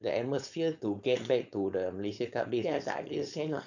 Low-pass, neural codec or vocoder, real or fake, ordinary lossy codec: 7.2 kHz; codec, 16 kHz, 4.8 kbps, FACodec; fake; none